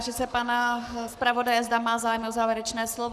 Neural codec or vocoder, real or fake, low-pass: codec, 44.1 kHz, 7.8 kbps, Pupu-Codec; fake; 14.4 kHz